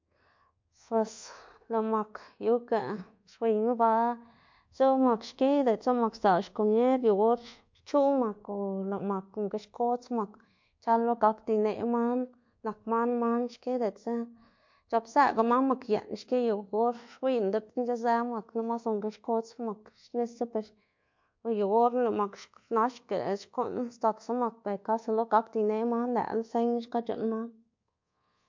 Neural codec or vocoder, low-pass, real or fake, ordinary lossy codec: codec, 24 kHz, 1.2 kbps, DualCodec; 7.2 kHz; fake; MP3, 48 kbps